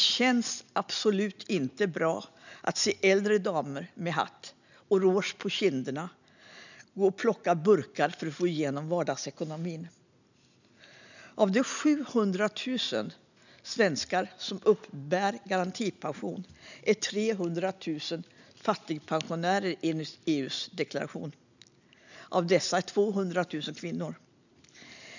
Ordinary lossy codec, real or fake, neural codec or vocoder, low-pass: none; real; none; 7.2 kHz